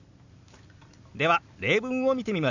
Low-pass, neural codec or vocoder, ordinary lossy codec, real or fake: 7.2 kHz; none; none; real